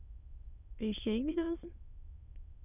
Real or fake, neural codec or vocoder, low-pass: fake; autoencoder, 22.05 kHz, a latent of 192 numbers a frame, VITS, trained on many speakers; 3.6 kHz